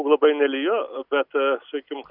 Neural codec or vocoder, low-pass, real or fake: none; 5.4 kHz; real